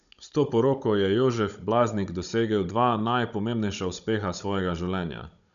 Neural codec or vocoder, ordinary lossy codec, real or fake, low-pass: codec, 16 kHz, 16 kbps, FunCodec, trained on Chinese and English, 50 frames a second; none; fake; 7.2 kHz